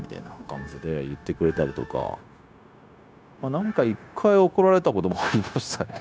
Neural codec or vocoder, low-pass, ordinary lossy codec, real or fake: codec, 16 kHz, 0.9 kbps, LongCat-Audio-Codec; none; none; fake